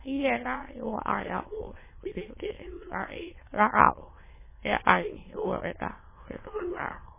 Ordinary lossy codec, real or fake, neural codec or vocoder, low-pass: MP3, 16 kbps; fake; autoencoder, 22.05 kHz, a latent of 192 numbers a frame, VITS, trained on many speakers; 3.6 kHz